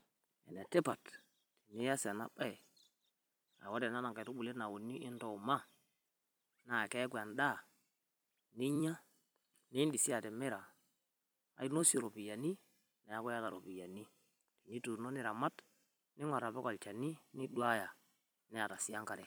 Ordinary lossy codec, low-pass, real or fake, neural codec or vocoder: none; none; fake; vocoder, 44.1 kHz, 128 mel bands every 256 samples, BigVGAN v2